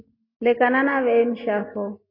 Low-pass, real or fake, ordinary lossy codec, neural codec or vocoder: 5.4 kHz; real; MP3, 24 kbps; none